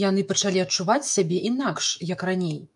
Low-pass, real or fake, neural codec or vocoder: 10.8 kHz; fake; autoencoder, 48 kHz, 128 numbers a frame, DAC-VAE, trained on Japanese speech